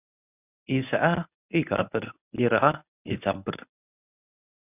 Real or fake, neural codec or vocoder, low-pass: fake; codec, 24 kHz, 0.9 kbps, WavTokenizer, medium speech release version 1; 3.6 kHz